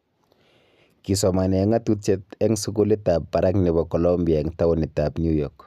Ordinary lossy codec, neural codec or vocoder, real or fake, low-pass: none; none; real; 14.4 kHz